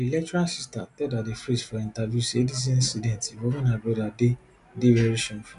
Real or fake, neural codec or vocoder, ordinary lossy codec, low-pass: real; none; none; 10.8 kHz